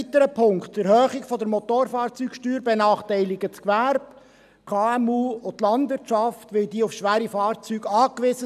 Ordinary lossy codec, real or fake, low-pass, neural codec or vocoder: none; real; 14.4 kHz; none